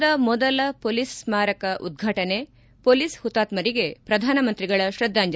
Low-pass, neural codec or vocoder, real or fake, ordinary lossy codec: none; none; real; none